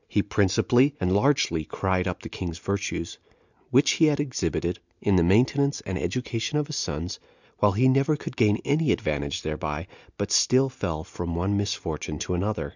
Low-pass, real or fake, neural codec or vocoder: 7.2 kHz; real; none